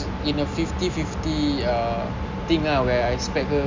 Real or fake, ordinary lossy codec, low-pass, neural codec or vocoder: real; MP3, 64 kbps; 7.2 kHz; none